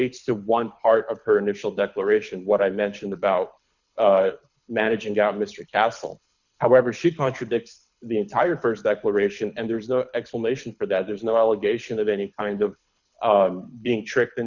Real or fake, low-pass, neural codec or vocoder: fake; 7.2 kHz; codec, 24 kHz, 6 kbps, HILCodec